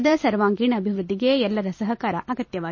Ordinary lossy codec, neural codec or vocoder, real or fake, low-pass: MP3, 32 kbps; vocoder, 44.1 kHz, 128 mel bands every 256 samples, BigVGAN v2; fake; 7.2 kHz